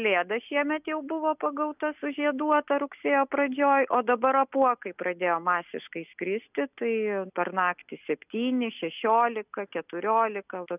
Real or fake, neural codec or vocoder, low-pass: real; none; 3.6 kHz